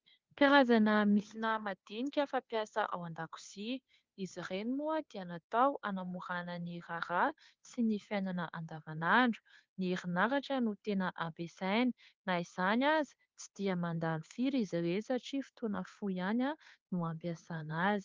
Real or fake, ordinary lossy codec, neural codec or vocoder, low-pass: fake; Opus, 32 kbps; codec, 16 kHz, 2 kbps, FunCodec, trained on Chinese and English, 25 frames a second; 7.2 kHz